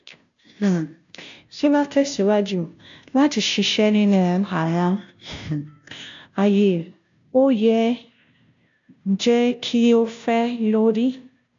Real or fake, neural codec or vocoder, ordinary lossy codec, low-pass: fake; codec, 16 kHz, 0.5 kbps, FunCodec, trained on Chinese and English, 25 frames a second; AAC, 64 kbps; 7.2 kHz